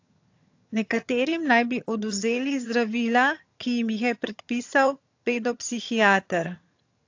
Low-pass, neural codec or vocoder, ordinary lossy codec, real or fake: 7.2 kHz; vocoder, 22.05 kHz, 80 mel bands, HiFi-GAN; AAC, 48 kbps; fake